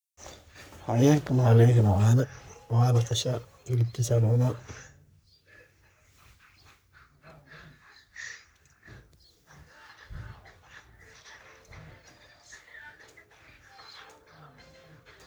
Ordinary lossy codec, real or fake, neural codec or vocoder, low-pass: none; fake; codec, 44.1 kHz, 3.4 kbps, Pupu-Codec; none